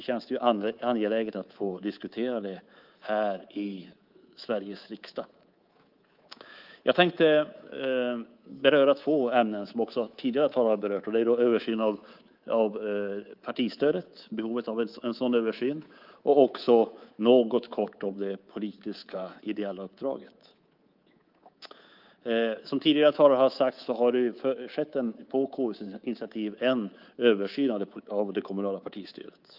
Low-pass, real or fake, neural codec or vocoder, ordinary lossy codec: 5.4 kHz; fake; codec, 24 kHz, 3.1 kbps, DualCodec; Opus, 32 kbps